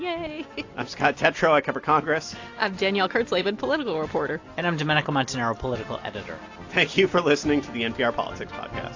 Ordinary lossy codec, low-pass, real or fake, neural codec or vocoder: AAC, 48 kbps; 7.2 kHz; real; none